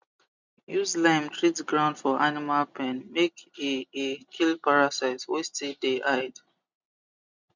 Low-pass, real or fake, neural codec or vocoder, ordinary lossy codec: 7.2 kHz; real; none; none